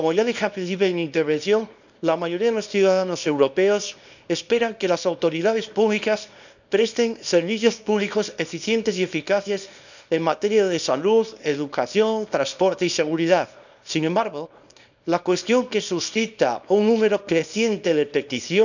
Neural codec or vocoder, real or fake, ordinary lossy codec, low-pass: codec, 24 kHz, 0.9 kbps, WavTokenizer, small release; fake; none; 7.2 kHz